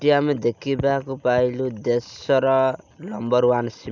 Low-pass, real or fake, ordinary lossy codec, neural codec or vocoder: 7.2 kHz; real; none; none